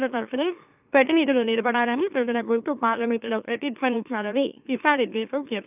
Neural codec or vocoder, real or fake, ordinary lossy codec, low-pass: autoencoder, 44.1 kHz, a latent of 192 numbers a frame, MeloTTS; fake; none; 3.6 kHz